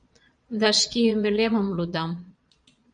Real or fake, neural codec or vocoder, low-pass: fake; vocoder, 22.05 kHz, 80 mel bands, Vocos; 9.9 kHz